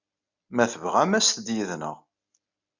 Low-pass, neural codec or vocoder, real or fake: 7.2 kHz; none; real